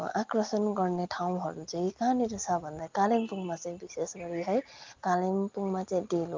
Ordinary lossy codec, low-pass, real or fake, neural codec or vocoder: Opus, 24 kbps; 7.2 kHz; real; none